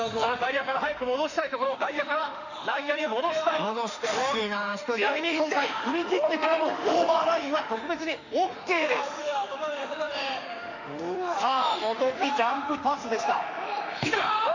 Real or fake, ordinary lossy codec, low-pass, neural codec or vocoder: fake; none; 7.2 kHz; autoencoder, 48 kHz, 32 numbers a frame, DAC-VAE, trained on Japanese speech